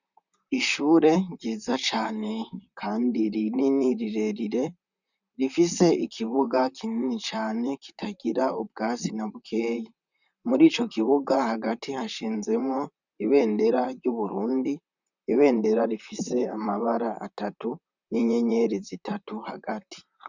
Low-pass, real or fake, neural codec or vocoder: 7.2 kHz; fake; vocoder, 44.1 kHz, 128 mel bands, Pupu-Vocoder